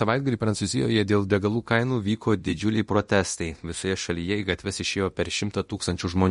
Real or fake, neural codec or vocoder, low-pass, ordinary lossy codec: fake; codec, 24 kHz, 0.9 kbps, DualCodec; 10.8 kHz; MP3, 48 kbps